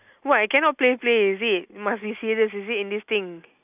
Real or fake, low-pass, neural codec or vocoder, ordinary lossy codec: real; 3.6 kHz; none; none